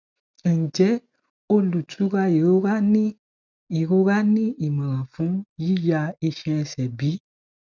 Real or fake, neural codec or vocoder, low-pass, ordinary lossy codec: real; none; 7.2 kHz; none